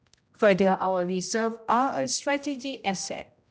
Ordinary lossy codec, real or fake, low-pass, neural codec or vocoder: none; fake; none; codec, 16 kHz, 0.5 kbps, X-Codec, HuBERT features, trained on general audio